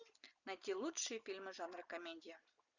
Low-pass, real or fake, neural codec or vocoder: 7.2 kHz; real; none